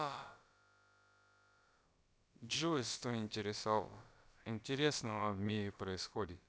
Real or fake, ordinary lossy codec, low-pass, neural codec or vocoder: fake; none; none; codec, 16 kHz, about 1 kbps, DyCAST, with the encoder's durations